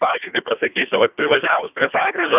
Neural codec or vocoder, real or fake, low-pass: codec, 24 kHz, 1.5 kbps, HILCodec; fake; 3.6 kHz